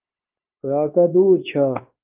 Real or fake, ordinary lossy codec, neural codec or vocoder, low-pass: fake; Opus, 32 kbps; codec, 16 kHz, 0.9 kbps, LongCat-Audio-Codec; 3.6 kHz